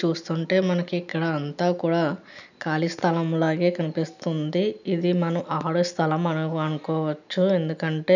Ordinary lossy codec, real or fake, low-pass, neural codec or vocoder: none; real; 7.2 kHz; none